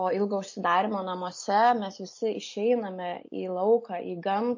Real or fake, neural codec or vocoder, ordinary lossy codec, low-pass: real; none; MP3, 32 kbps; 7.2 kHz